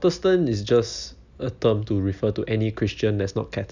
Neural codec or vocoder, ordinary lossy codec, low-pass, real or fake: none; none; 7.2 kHz; real